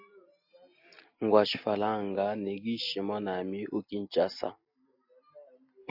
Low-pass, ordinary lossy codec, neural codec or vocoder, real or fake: 5.4 kHz; AAC, 48 kbps; none; real